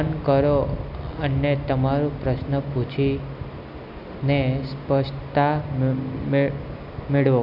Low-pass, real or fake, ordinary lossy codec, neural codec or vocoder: 5.4 kHz; real; none; none